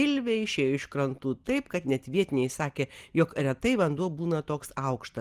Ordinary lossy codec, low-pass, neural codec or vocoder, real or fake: Opus, 24 kbps; 14.4 kHz; none; real